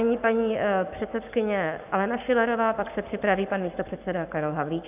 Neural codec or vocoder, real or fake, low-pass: codec, 44.1 kHz, 7.8 kbps, Pupu-Codec; fake; 3.6 kHz